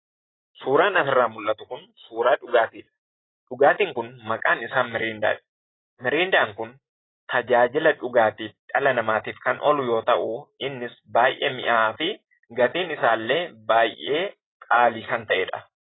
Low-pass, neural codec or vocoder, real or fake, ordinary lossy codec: 7.2 kHz; none; real; AAC, 16 kbps